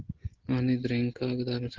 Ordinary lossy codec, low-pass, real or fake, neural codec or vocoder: Opus, 16 kbps; 7.2 kHz; real; none